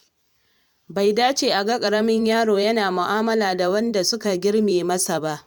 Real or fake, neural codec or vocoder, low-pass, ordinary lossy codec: fake; vocoder, 44.1 kHz, 128 mel bands every 512 samples, BigVGAN v2; 19.8 kHz; none